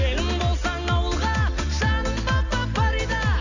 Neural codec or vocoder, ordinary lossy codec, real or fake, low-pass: none; none; real; 7.2 kHz